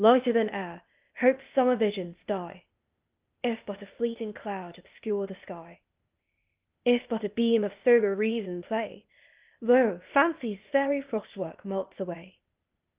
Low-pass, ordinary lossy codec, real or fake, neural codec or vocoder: 3.6 kHz; Opus, 32 kbps; fake; codec, 16 kHz, 0.8 kbps, ZipCodec